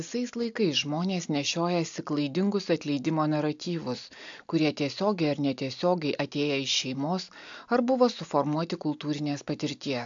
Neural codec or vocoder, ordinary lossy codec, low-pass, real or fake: none; AAC, 64 kbps; 7.2 kHz; real